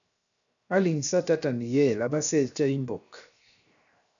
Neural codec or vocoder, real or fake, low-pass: codec, 16 kHz, 0.7 kbps, FocalCodec; fake; 7.2 kHz